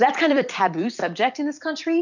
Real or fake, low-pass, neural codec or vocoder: fake; 7.2 kHz; vocoder, 44.1 kHz, 128 mel bands every 256 samples, BigVGAN v2